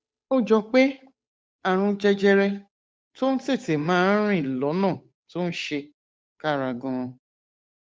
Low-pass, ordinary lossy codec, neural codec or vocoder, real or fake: none; none; codec, 16 kHz, 8 kbps, FunCodec, trained on Chinese and English, 25 frames a second; fake